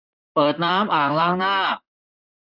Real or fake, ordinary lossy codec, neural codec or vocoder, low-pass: fake; none; vocoder, 44.1 kHz, 128 mel bands, Pupu-Vocoder; 5.4 kHz